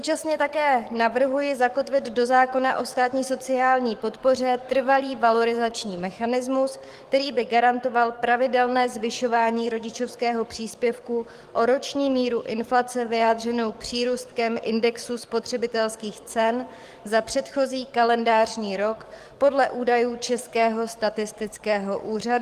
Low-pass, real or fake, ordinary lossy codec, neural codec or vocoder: 14.4 kHz; fake; Opus, 24 kbps; codec, 44.1 kHz, 7.8 kbps, DAC